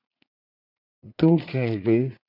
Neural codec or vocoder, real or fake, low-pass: vocoder, 44.1 kHz, 80 mel bands, Vocos; fake; 5.4 kHz